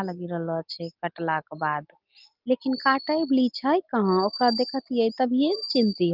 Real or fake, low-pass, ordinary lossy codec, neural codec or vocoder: real; 5.4 kHz; Opus, 24 kbps; none